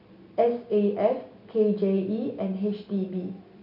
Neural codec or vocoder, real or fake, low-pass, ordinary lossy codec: none; real; 5.4 kHz; none